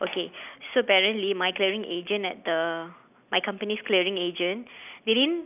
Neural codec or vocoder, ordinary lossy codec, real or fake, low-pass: none; none; real; 3.6 kHz